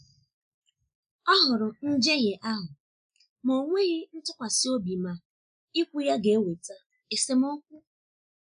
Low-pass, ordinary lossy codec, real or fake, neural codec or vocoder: 9.9 kHz; none; real; none